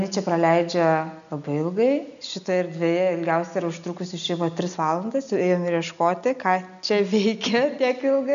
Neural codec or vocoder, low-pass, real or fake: none; 7.2 kHz; real